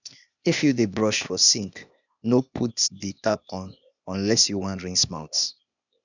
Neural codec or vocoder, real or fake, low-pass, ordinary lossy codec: codec, 16 kHz, 0.8 kbps, ZipCodec; fake; 7.2 kHz; none